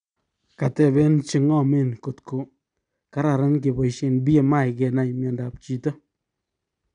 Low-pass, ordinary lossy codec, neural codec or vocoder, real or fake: 9.9 kHz; none; none; real